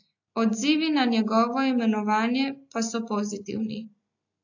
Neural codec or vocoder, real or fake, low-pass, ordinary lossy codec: none; real; 7.2 kHz; AAC, 48 kbps